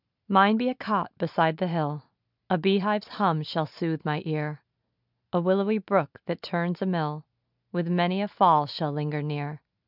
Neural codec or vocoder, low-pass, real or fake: none; 5.4 kHz; real